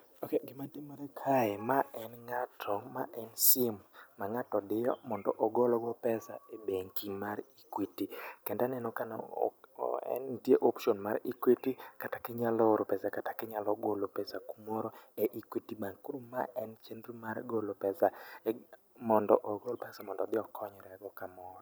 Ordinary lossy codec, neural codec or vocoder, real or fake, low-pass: none; none; real; none